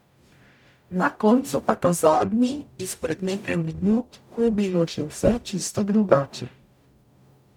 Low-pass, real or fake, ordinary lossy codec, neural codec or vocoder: 19.8 kHz; fake; none; codec, 44.1 kHz, 0.9 kbps, DAC